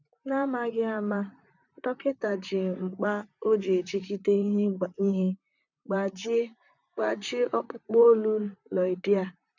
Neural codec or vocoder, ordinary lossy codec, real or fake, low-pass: vocoder, 44.1 kHz, 128 mel bands, Pupu-Vocoder; none; fake; 7.2 kHz